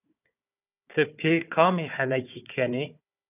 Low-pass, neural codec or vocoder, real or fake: 3.6 kHz; codec, 16 kHz, 4 kbps, FunCodec, trained on Chinese and English, 50 frames a second; fake